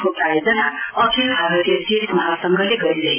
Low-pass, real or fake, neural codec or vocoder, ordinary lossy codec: 3.6 kHz; real; none; AAC, 24 kbps